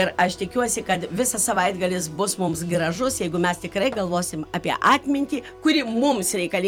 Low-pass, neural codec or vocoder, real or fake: 19.8 kHz; vocoder, 44.1 kHz, 128 mel bands every 256 samples, BigVGAN v2; fake